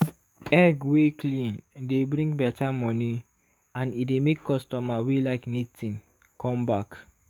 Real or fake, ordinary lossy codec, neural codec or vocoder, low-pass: fake; none; codec, 44.1 kHz, 7.8 kbps, DAC; 19.8 kHz